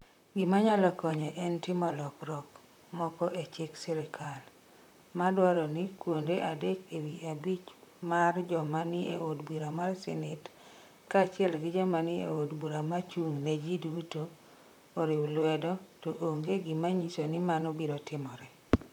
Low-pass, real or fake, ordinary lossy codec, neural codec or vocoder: 19.8 kHz; fake; none; vocoder, 44.1 kHz, 128 mel bands, Pupu-Vocoder